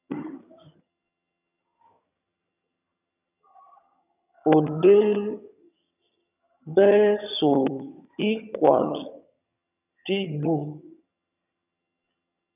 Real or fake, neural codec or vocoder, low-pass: fake; vocoder, 22.05 kHz, 80 mel bands, HiFi-GAN; 3.6 kHz